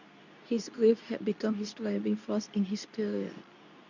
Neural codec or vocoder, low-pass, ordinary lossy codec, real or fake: codec, 24 kHz, 0.9 kbps, WavTokenizer, medium speech release version 2; 7.2 kHz; none; fake